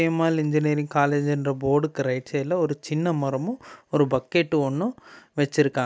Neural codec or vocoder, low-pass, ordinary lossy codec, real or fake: none; none; none; real